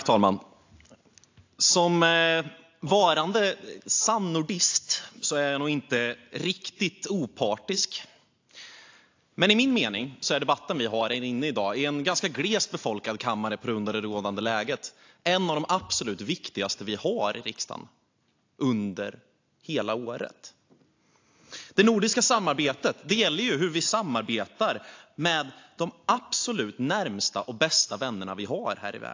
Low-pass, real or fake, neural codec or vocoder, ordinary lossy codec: 7.2 kHz; real; none; AAC, 48 kbps